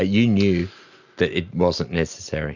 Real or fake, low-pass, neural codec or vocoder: real; 7.2 kHz; none